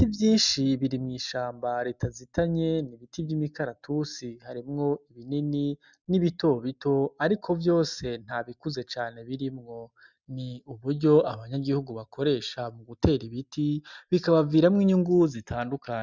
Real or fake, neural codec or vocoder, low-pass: real; none; 7.2 kHz